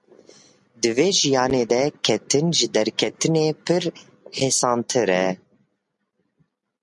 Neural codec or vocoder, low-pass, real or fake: none; 10.8 kHz; real